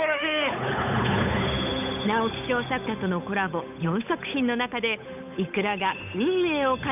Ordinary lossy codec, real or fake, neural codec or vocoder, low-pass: none; fake; codec, 16 kHz, 8 kbps, FunCodec, trained on Chinese and English, 25 frames a second; 3.6 kHz